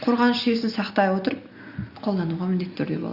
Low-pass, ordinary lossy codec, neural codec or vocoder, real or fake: 5.4 kHz; Opus, 64 kbps; none; real